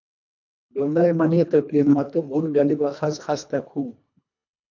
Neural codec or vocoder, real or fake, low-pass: codec, 24 kHz, 1.5 kbps, HILCodec; fake; 7.2 kHz